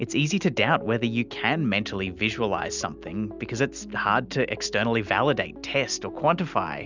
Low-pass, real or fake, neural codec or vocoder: 7.2 kHz; real; none